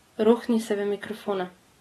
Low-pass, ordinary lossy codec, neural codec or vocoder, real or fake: 19.8 kHz; AAC, 32 kbps; none; real